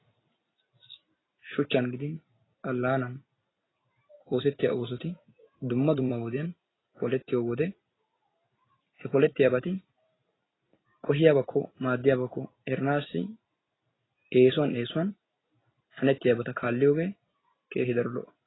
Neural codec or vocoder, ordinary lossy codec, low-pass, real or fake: vocoder, 44.1 kHz, 128 mel bands every 256 samples, BigVGAN v2; AAC, 16 kbps; 7.2 kHz; fake